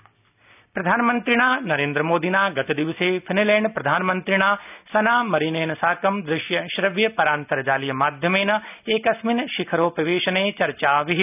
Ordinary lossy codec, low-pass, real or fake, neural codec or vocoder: none; 3.6 kHz; real; none